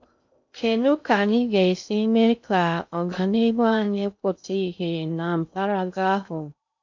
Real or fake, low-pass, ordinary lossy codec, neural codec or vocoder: fake; 7.2 kHz; MP3, 64 kbps; codec, 16 kHz in and 24 kHz out, 0.6 kbps, FocalCodec, streaming, 2048 codes